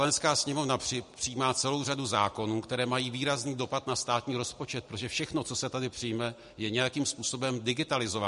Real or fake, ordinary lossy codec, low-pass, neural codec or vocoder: real; MP3, 48 kbps; 14.4 kHz; none